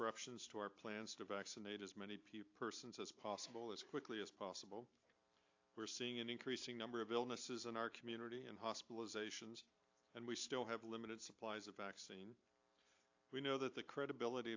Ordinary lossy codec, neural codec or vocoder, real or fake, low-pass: AAC, 48 kbps; vocoder, 44.1 kHz, 128 mel bands every 512 samples, BigVGAN v2; fake; 7.2 kHz